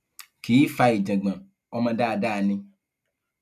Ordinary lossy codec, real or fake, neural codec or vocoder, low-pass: none; real; none; 14.4 kHz